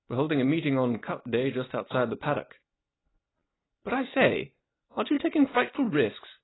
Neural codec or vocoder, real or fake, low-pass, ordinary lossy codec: none; real; 7.2 kHz; AAC, 16 kbps